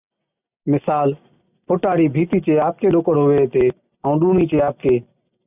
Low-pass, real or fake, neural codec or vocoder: 3.6 kHz; real; none